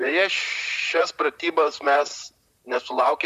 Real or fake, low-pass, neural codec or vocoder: fake; 14.4 kHz; vocoder, 44.1 kHz, 128 mel bands, Pupu-Vocoder